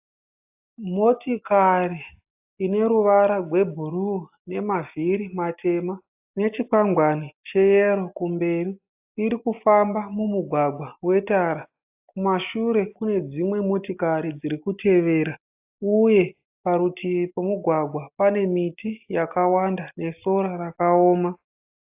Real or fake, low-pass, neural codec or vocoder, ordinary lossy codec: real; 3.6 kHz; none; AAC, 32 kbps